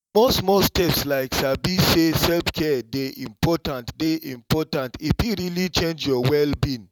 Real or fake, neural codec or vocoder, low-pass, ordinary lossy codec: fake; vocoder, 44.1 kHz, 128 mel bands every 512 samples, BigVGAN v2; 19.8 kHz; none